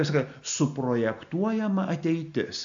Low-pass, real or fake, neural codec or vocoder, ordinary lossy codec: 7.2 kHz; real; none; AAC, 64 kbps